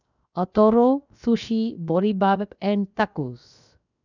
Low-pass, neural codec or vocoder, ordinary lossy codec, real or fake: 7.2 kHz; codec, 16 kHz, 0.7 kbps, FocalCodec; none; fake